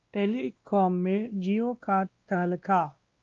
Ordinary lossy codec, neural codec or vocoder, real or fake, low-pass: Opus, 32 kbps; codec, 16 kHz, 1 kbps, X-Codec, WavLM features, trained on Multilingual LibriSpeech; fake; 7.2 kHz